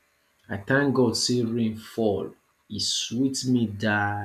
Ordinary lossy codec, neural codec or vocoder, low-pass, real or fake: none; none; 14.4 kHz; real